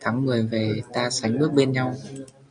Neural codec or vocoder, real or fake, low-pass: none; real; 9.9 kHz